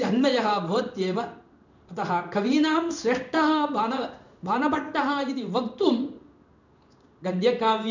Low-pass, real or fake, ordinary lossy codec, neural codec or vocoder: 7.2 kHz; fake; none; codec, 16 kHz in and 24 kHz out, 1 kbps, XY-Tokenizer